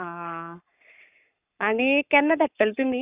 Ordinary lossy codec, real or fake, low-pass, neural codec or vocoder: Opus, 64 kbps; fake; 3.6 kHz; codec, 44.1 kHz, 7.8 kbps, Pupu-Codec